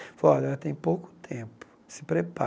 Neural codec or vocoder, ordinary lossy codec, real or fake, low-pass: none; none; real; none